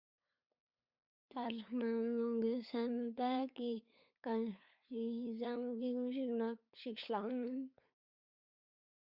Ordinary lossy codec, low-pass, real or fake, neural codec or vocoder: Opus, 64 kbps; 5.4 kHz; fake; codec, 16 kHz, 8 kbps, FunCodec, trained on LibriTTS, 25 frames a second